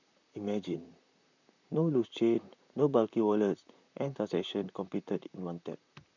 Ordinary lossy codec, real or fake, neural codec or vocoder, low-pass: none; fake; vocoder, 44.1 kHz, 128 mel bands, Pupu-Vocoder; 7.2 kHz